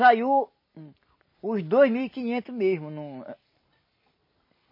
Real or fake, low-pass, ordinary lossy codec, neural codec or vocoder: real; 5.4 kHz; MP3, 24 kbps; none